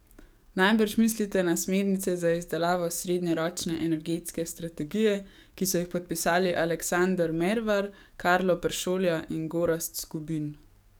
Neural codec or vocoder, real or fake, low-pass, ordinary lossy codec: codec, 44.1 kHz, 7.8 kbps, DAC; fake; none; none